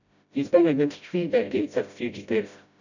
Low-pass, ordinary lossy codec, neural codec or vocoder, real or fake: 7.2 kHz; none; codec, 16 kHz, 0.5 kbps, FreqCodec, smaller model; fake